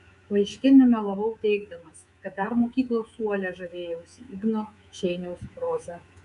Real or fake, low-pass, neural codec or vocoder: fake; 10.8 kHz; codec, 24 kHz, 3.1 kbps, DualCodec